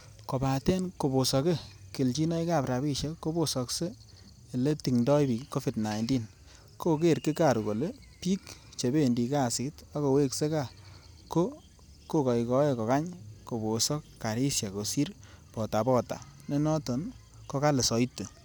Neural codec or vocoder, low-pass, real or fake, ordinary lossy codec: none; none; real; none